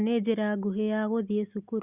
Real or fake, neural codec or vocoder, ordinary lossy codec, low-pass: real; none; Opus, 64 kbps; 3.6 kHz